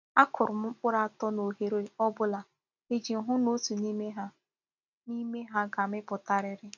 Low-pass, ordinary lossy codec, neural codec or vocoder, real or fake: 7.2 kHz; none; none; real